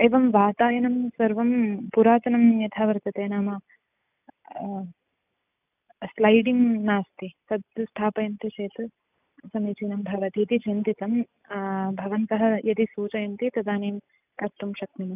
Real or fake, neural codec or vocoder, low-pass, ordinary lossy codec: real; none; 3.6 kHz; none